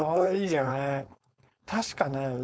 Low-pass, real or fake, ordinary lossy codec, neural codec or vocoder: none; fake; none; codec, 16 kHz, 4.8 kbps, FACodec